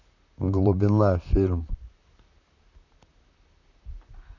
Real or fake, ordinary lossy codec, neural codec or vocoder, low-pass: fake; none; vocoder, 44.1 kHz, 128 mel bands, Pupu-Vocoder; 7.2 kHz